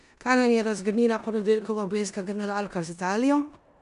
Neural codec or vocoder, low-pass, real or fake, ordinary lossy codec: codec, 16 kHz in and 24 kHz out, 0.9 kbps, LongCat-Audio-Codec, four codebook decoder; 10.8 kHz; fake; none